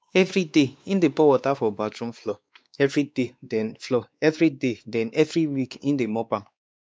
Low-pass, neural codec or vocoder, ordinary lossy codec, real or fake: none; codec, 16 kHz, 2 kbps, X-Codec, WavLM features, trained on Multilingual LibriSpeech; none; fake